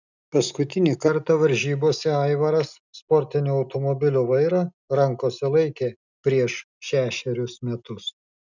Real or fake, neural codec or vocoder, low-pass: real; none; 7.2 kHz